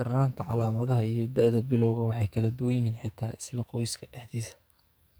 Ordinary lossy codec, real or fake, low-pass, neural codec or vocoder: none; fake; none; codec, 44.1 kHz, 2.6 kbps, SNAC